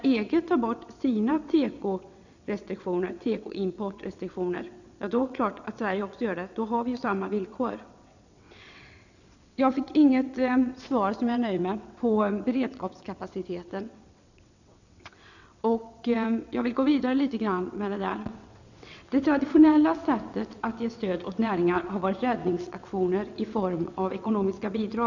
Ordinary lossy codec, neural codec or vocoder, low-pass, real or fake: none; vocoder, 22.05 kHz, 80 mel bands, WaveNeXt; 7.2 kHz; fake